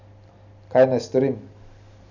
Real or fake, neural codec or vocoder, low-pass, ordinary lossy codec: real; none; 7.2 kHz; none